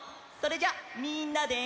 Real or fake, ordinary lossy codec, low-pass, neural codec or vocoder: real; none; none; none